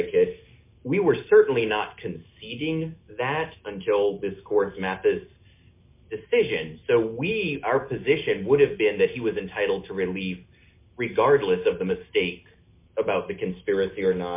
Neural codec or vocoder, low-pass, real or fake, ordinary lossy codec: none; 3.6 kHz; real; MP3, 24 kbps